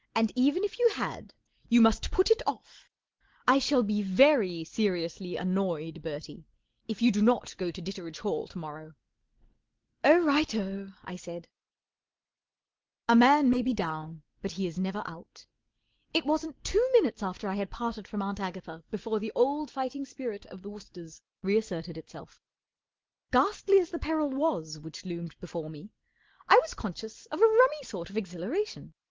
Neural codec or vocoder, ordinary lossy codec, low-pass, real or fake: none; Opus, 16 kbps; 7.2 kHz; real